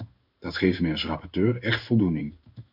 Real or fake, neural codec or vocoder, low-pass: fake; codec, 16 kHz in and 24 kHz out, 1 kbps, XY-Tokenizer; 5.4 kHz